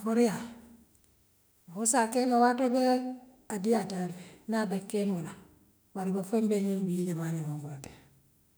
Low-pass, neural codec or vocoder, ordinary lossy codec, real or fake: none; autoencoder, 48 kHz, 32 numbers a frame, DAC-VAE, trained on Japanese speech; none; fake